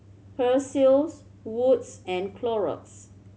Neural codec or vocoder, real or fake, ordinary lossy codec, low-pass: none; real; none; none